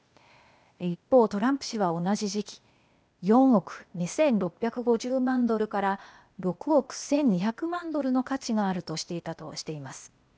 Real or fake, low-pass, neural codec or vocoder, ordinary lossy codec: fake; none; codec, 16 kHz, 0.8 kbps, ZipCodec; none